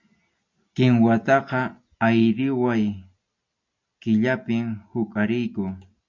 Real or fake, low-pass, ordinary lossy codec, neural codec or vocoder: real; 7.2 kHz; MP3, 64 kbps; none